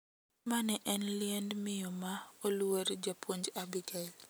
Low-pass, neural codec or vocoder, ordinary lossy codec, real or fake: none; none; none; real